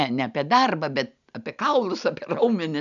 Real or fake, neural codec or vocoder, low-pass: real; none; 7.2 kHz